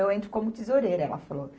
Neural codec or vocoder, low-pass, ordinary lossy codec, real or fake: none; none; none; real